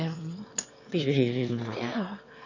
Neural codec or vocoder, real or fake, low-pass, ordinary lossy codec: autoencoder, 22.05 kHz, a latent of 192 numbers a frame, VITS, trained on one speaker; fake; 7.2 kHz; none